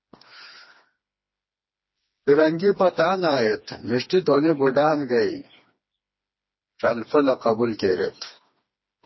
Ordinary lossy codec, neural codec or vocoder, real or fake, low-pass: MP3, 24 kbps; codec, 16 kHz, 2 kbps, FreqCodec, smaller model; fake; 7.2 kHz